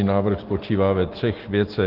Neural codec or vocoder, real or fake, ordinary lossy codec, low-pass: none; real; Opus, 16 kbps; 5.4 kHz